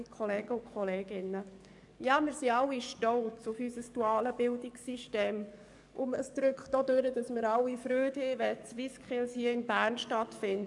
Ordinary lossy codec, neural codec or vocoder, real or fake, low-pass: none; codec, 44.1 kHz, 7.8 kbps, DAC; fake; 10.8 kHz